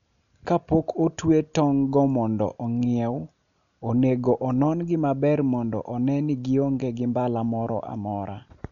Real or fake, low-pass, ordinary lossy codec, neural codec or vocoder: real; 7.2 kHz; none; none